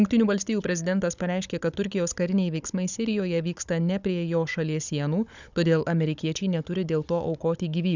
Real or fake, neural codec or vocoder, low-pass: fake; codec, 16 kHz, 16 kbps, FunCodec, trained on Chinese and English, 50 frames a second; 7.2 kHz